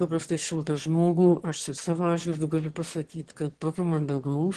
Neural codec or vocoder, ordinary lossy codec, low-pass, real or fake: autoencoder, 22.05 kHz, a latent of 192 numbers a frame, VITS, trained on one speaker; Opus, 16 kbps; 9.9 kHz; fake